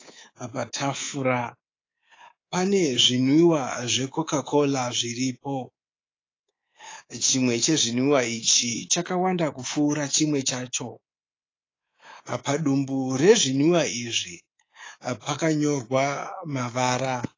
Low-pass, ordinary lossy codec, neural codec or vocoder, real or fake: 7.2 kHz; AAC, 32 kbps; codec, 24 kHz, 3.1 kbps, DualCodec; fake